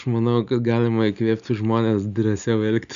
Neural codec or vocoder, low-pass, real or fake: none; 7.2 kHz; real